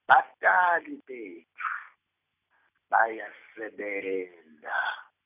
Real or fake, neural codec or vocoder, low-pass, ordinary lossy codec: real; none; 3.6 kHz; none